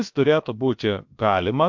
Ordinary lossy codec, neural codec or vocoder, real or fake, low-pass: MP3, 64 kbps; codec, 16 kHz, about 1 kbps, DyCAST, with the encoder's durations; fake; 7.2 kHz